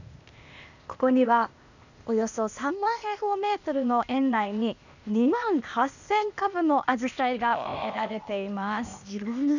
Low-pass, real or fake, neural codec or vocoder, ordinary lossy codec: 7.2 kHz; fake; codec, 16 kHz, 0.8 kbps, ZipCodec; none